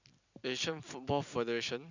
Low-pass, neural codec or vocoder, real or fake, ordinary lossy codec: 7.2 kHz; none; real; none